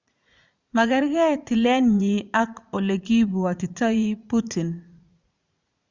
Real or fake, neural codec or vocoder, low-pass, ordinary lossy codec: fake; vocoder, 44.1 kHz, 80 mel bands, Vocos; 7.2 kHz; Opus, 64 kbps